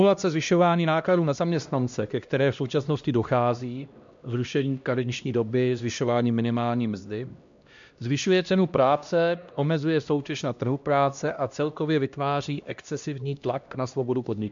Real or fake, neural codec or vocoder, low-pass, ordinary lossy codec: fake; codec, 16 kHz, 1 kbps, X-Codec, HuBERT features, trained on LibriSpeech; 7.2 kHz; MP3, 64 kbps